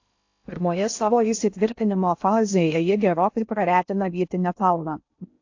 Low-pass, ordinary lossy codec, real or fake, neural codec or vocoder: 7.2 kHz; AAC, 48 kbps; fake; codec, 16 kHz in and 24 kHz out, 0.6 kbps, FocalCodec, streaming, 2048 codes